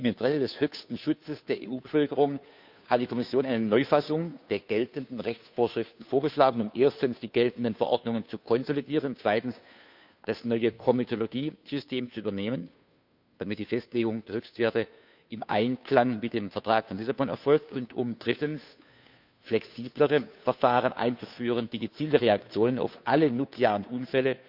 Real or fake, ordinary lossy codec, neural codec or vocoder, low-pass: fake; none; codec, 16 kHz, 2 kbps, FunCodec, trained on Chinese and English, 25 frames a second; 5.4 kHz